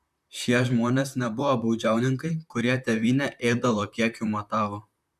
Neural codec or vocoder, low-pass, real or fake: vocoder, 44.1 kHz, 128 mel bands, Pupu-Vocoder; 14.4 kHz; fake